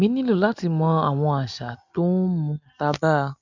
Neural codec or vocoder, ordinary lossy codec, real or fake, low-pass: none; none; real; 7.2 kHz